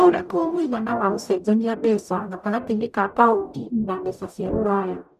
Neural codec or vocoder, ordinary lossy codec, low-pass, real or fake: codec, 44.1 kHz, 0.9 kbps, DAC; none; 14.4 kHz; fake